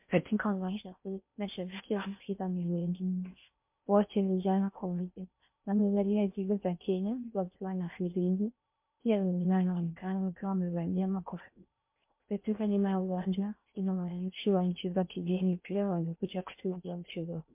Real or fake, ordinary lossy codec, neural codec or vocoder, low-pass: fake; MP3, 32 kbps; codec, 16 kHz in and 24 kHz out, 0.6 kbps, FocalCodec, streaming, 4096 codes; 3.6 kHz